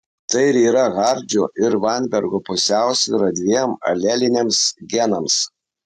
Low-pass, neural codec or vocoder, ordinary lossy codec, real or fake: 14.4 kHz; none; AAC, 96 kbps; real